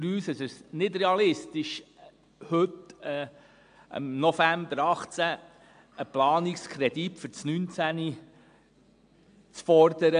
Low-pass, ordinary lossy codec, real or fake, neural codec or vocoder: 9.9 kHz; AAC, 64 kbps; real; none